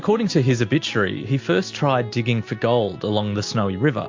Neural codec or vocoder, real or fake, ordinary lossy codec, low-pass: none; real; MP3, 48 kbps; 7.2 kHz